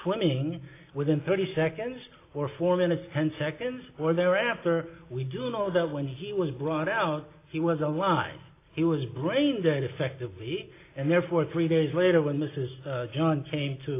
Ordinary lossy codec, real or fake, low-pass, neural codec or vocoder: AAC, 24 kbps; real; 3.6 kHz; none